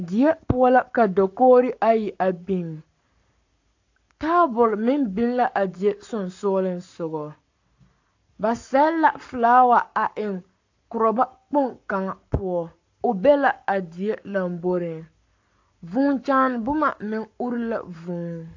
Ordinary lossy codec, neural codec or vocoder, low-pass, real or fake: AAC, 48 kbps; codec, 44.1 kHz, 7.8 kbps, Pupu-Codec; 7.2 kHz; fake